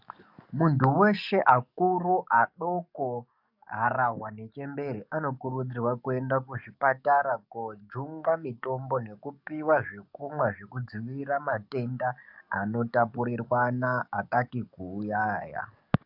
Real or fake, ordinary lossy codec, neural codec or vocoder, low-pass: fake; AAC, 48 kbps; codec, 44.1 kHz, 7.8 kbps, DAC; 5.4 kHz